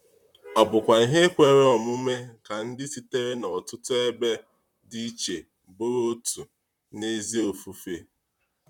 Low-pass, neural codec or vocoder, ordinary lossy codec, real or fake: 19.8 kHz; vocoder, 44.1 kHz, 128 mel bands, Pupu-Vocoder; none; fake